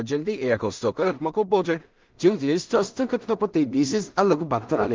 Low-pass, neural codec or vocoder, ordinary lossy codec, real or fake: 7.2 kHz; codec, 16 kHz in and 24 kHz out, 0.4 kbps, LongCat-Audio-Codec, two codebook decoder; Opus, 32 kbps; fake